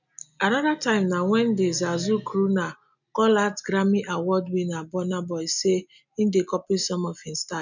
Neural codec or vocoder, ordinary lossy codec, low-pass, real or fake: none; none; 7.2 kHz; real